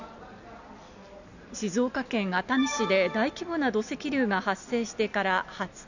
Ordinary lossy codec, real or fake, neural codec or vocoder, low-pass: none; real; none; 7.2 kHz